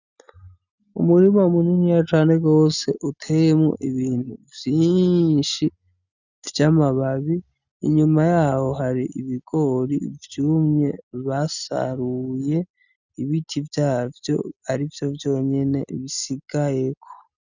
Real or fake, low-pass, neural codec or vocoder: real; 7.2 kHz; none